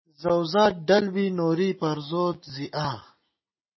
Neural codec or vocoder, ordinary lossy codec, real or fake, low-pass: none; MP3, 24 kbps; real; 7.2 kHz